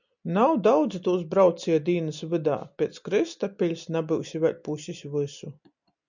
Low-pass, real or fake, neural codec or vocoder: 7.2 kHz; real; none